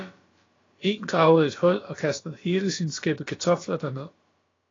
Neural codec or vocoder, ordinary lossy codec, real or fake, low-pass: codec, 16 kHz, about 1 kbps, DyCAST, with the encoder's durations; AAC, 32 kbps; fake; 7.2 kHz